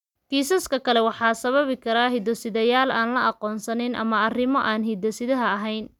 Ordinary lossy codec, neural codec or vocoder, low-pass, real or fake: none; none; 19.8 kHz; real